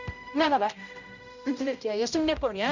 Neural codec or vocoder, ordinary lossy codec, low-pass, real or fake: codec, 16 kHz, 0.5 kbps, X-Codec, HuBERT features, trained on general audio; none; 7.2 kHz; fake